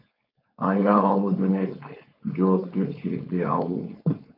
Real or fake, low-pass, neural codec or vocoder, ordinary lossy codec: fake; 5.4 kHz; codec, 16 kHz, 4.8 kbps, FACodec; AAC, 32 kbps